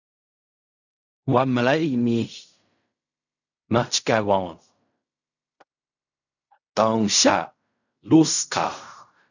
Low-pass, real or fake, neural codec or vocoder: 7.2 kHz; fake; codec, 16 kHz in and 24 kHz out, 0.4 kbps, LongCat-Audio-Codec, fine tuned four codebook decoder